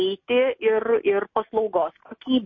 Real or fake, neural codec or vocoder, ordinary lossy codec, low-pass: real; none; MP3, 32 kbps; 7.2 kHz